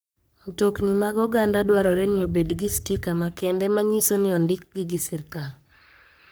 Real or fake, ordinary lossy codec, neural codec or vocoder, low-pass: fake; none; codec, 44.1 kHz, 3.4 kbps, Pupu-Codec; none